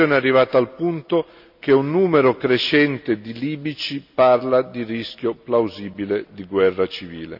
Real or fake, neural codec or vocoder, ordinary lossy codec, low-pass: real; none; none; 5.4 kHz